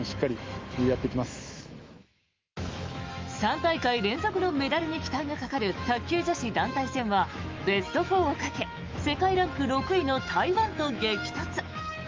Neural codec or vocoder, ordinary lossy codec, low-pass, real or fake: autoencoder, 48 kHz, 128 numbers a frame, DAC-VAE, trained on Japanese speech; Opus, 32 kbps; 7.2 kHz; fake